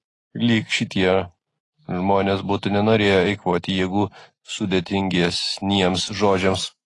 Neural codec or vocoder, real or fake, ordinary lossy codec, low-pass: none; real; AAC, 32 kbps; 10.8 kHz